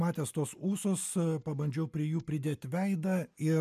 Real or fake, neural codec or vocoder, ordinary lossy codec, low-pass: real; none; MP3, 96 kbps; 14.4 kHz